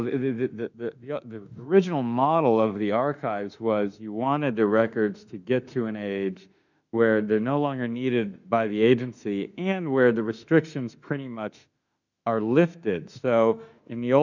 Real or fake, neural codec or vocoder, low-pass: fake; autoencoder, 48 kHz, 32 numbers a frame, DAC-VAE, trained on Japanese speech; 7.2 kHz